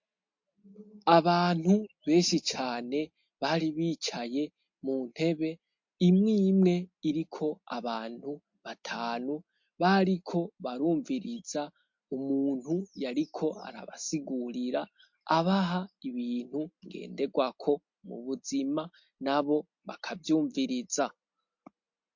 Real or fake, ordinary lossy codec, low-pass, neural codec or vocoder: real; MP3, 48 kbps; 7.2 kHz; none